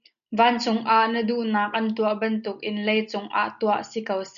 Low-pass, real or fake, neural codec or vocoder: 7.2 kHz; real; none